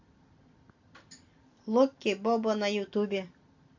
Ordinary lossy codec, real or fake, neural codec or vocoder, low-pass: AAC, 48 kbps; real; none; 7.2 kHz